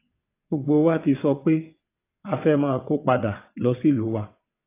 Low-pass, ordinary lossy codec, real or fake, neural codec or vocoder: 3.6 kHz; AAC, 24 kbps; fake; vocoder, 22.05 kHz, 80 mel bands, WaveNeXt